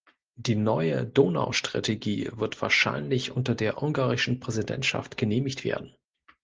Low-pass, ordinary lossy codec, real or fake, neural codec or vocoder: 7.2 kHz; Opus, 16 kbps; real; none